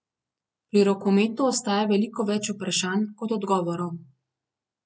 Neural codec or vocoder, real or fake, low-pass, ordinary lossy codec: none; real; none; none